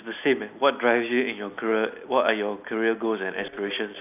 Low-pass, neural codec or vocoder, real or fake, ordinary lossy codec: 3.6 kHz; none; real; none